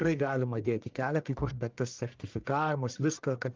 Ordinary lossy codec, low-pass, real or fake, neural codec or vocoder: Opus, 32 kbps; 7.2 kHz; fake; codec, 44.1 kHz, 1.7 kbps, Pupu-Codec